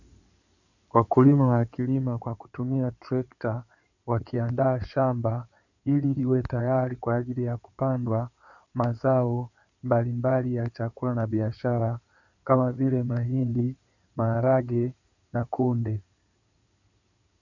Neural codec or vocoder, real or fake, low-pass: codec, 16 kHz in and 24 kHz out, 2.2 kbps, FireRedTTS-2 codec; fake; 7.2 kHz